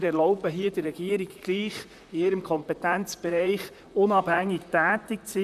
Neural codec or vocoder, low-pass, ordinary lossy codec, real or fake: vocoder, 44.1 kHz, 128 mel bands, Pupu-Vocoder; 14.4 kHz; none; fake